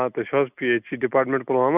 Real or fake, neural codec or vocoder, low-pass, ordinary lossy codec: real; none; 3.6 kHz; none